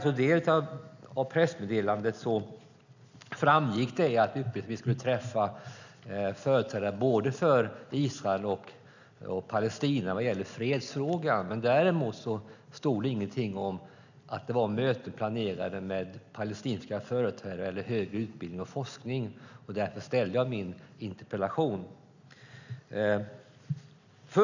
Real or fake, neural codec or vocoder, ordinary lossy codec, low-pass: real; none; none; 7.2 kHz